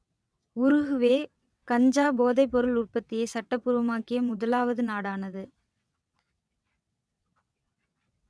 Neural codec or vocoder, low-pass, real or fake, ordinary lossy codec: vocoder, 22.05 kHz, 80 mel bands, WaveNeXt; none; fake; none